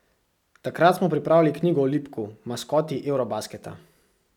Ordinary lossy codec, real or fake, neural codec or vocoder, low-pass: none; real; none; 19.8 kHz